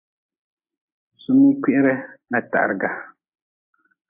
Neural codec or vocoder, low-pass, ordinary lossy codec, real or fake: none; 3.6 kHz; MP3, 24 kbps; real